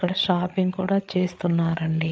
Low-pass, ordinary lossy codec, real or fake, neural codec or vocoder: none; none; fake; codec, 16 kHz, 8 kbps, FreqCodec, larger model